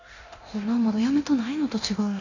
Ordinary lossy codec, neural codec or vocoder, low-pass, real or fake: none; codec, 24 kHz, 0.9 kbps, DualCodec; 7.2 kHz; fake